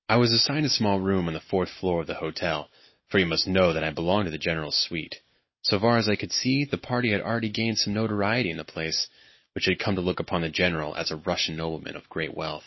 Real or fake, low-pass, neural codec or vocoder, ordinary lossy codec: real; 7.2 kHz; none; MP3, 24 kbps